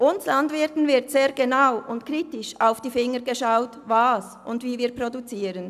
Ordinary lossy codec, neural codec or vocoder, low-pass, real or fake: none; none; 14.4 kHz; real